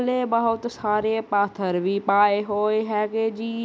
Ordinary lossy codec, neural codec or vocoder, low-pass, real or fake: none; none; none; real